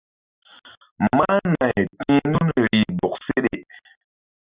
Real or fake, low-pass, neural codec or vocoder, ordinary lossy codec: real; 3.6 kHz; none; Opus, 64 kbps